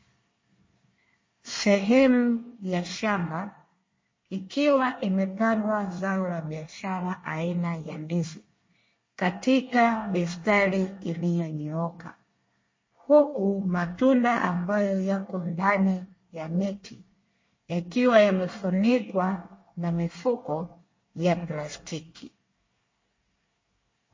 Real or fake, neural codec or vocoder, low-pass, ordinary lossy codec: fake; codec, 24 kHz, 1 kbps, SNAC; 7.2 kHz; MP3, 32 kbps